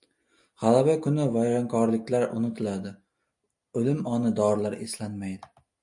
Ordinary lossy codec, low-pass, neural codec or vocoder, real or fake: MP3, 48 kbps; 10.8 kHz; none; real